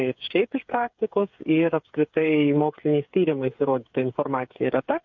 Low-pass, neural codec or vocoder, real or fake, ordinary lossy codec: 7.2 kHz; codec, 16 kHz, 8 kbps, FreqCodec, smaller model; fake; MP3, 48 kbps